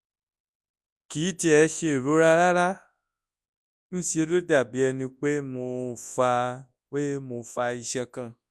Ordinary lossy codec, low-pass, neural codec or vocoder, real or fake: none; none; codec, 24 kHz, 0.9 kbps, WavTokenizer, large speech release; fake